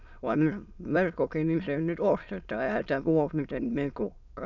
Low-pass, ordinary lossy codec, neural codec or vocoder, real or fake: 7.2 kHz; none; autoencoder, 22.05 kHz, a latent of 192 numbers a frame, VITS, trained on many speakers; fake